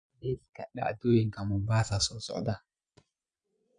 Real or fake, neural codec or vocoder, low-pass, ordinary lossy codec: fake; vocoder, 22.05 kHz, 80 mel bands, Vocos; 9.9 kHz; none